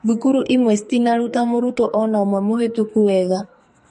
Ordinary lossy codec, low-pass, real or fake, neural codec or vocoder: MP3, 48 kbps; 14.4 kHz; fake; codec, 44.1 kHz, 2.6 kbps, SNAC